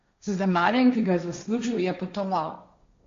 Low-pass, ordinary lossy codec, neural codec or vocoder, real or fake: 7.2 kHz; MP3, 48 kbps; codec, 16 kHz, 1.1 kbps, Voila-Tokenizer; fake